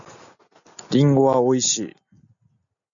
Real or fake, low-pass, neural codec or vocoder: real; 7.2 kHz; none